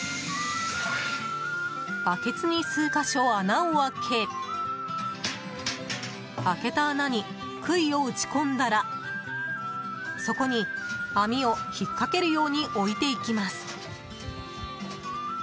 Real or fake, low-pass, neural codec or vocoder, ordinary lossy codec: real; none; none; none